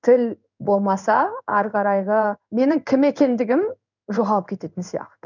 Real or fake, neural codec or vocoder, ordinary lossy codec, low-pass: fake; codec, 16 kHz in and 24 kHz out, 1 kbps, XY-Tokenizer; none; 7.2 kHz